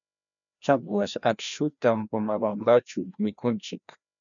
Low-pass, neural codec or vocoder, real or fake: 7.2 kHz; codec, 16 kHz, 1 kbps, FreqCodec, larger model; fake